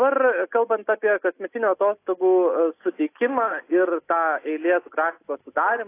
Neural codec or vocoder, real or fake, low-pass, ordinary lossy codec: none; real; 3.6 kHz; AAC, 24 kbps